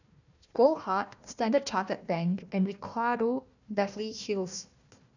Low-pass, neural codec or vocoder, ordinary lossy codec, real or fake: 7.2 kHz; codec, 16 kHz, 1 kbps, FunCodec, trained on Chinese and English, 50 frames a second; none; fake